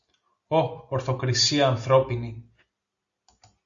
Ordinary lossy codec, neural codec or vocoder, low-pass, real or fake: Opus, 64 kbps; none; 7.2 kHz; real